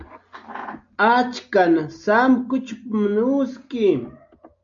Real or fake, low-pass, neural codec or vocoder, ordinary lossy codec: real; 7.2 kHz; none; AAC, 64 kbps